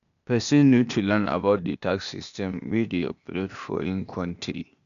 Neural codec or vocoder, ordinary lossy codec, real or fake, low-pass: codec, 16 kHz, 0.8 kbps, ZipCodec; none; fake; 7.2 kHz